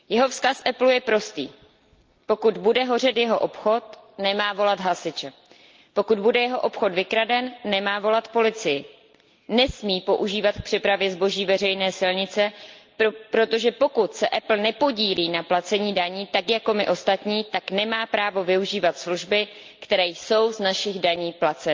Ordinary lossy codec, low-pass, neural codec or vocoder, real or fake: Opus, 24 kbps; 7.2 kHz; none; real